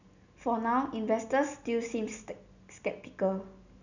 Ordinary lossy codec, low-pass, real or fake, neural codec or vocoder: none; 7.2 kHz; real; none